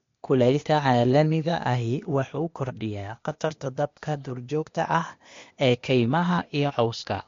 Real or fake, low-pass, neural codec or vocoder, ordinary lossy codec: fake; 7.2 kHz; codec, 16 kHz, 0.8 kbps, ZipCodec; MP3, 48 kbps